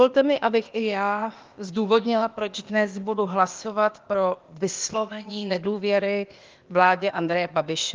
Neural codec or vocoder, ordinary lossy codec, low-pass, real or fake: codec, 16 kHz, 0.8 kbps, ZipCodec; Opus, 32 kbps; 7.2 kHz; fake